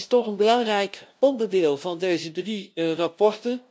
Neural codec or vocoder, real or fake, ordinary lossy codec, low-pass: codec, 16 kHz, 0.5 kbps, FunCodec, trained on LibriTTS, 25 frames a second; fake; none; none